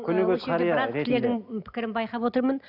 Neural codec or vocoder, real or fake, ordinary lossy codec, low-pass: none; real; none; 5.4 kHz